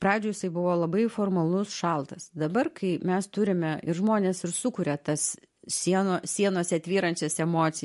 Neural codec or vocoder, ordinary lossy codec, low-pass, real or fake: none; MP3, 48 kbps; 14.4 kHz; real